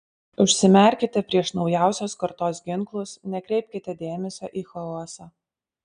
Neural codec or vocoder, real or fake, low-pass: none; real; 9.9 kHz